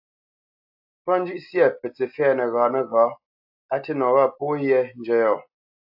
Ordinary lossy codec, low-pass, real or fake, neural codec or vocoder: AAC, 48 kbps; 5.4 kHz; real; none